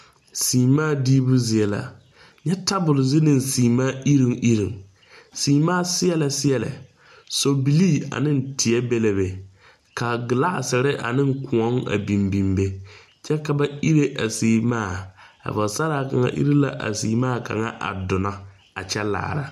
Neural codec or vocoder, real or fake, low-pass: none; real; 14.4 kHz